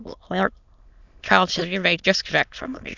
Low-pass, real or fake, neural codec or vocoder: 7.2 kHz; fake; autoencoder, 22.05 kHz, a latent of 192 numbers a frame, VITS, trained on many speakers